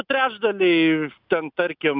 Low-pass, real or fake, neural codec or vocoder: 5.4 kHz; real; none